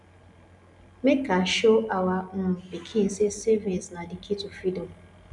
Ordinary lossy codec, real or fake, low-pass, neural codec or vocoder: none; real; 10.8 kHz; none